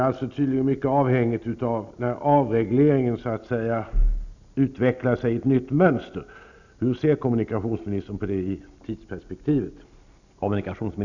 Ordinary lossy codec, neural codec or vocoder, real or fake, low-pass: none; none; real; 7.2 kHz